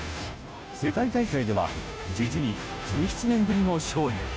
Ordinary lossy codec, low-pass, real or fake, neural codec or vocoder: none; none; fake; codec, 16 kHz, 0.5 kbps, FunCodec, trained on Chinese and English, 25 frames a second